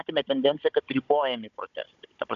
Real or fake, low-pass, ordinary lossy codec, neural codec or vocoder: fake; 7.2 kHz; AAC, 96 kbps; codec, 16 kHz, 16 kbps, FunCodec, trained on Chinese and English, 50 frames a second